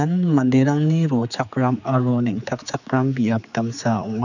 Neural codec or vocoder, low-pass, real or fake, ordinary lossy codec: codec, 16 kHz, 4 kbps, X-Codec, HuBERT features, trained on general audio; 7.2 kHz; fake; none